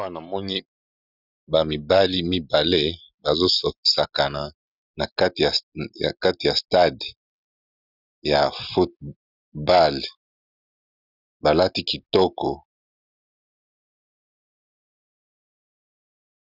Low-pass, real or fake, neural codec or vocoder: 5.4 kHz; real; none